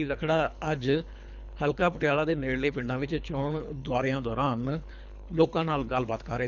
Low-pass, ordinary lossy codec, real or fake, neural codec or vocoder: 7.2 kHz; none; fake; codec, 24 kHz, 3 kbps, HILCodec